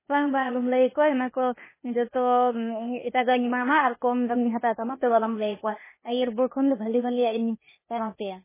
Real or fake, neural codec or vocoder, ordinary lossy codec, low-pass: fake; codec, 16 kHz, 0.8 kbps, ZipCodec; MP3, 16 kbps; 3.6 kHz